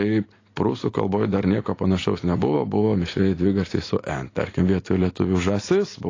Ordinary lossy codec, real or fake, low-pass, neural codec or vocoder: AAC, 32 kbps; real; 7.2 kHz; none